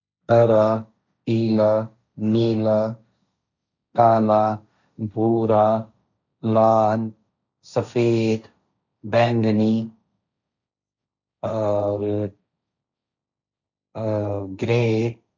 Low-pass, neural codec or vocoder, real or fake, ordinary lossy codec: 7.2 kHz; codec, 16 kHz, 1.1 kbps, Voila-Tokenizer; fake; none